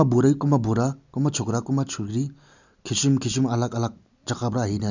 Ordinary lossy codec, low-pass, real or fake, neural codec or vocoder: none; 7.2 kHz; real; none